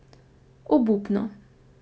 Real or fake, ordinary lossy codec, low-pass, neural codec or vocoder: real; none; none; none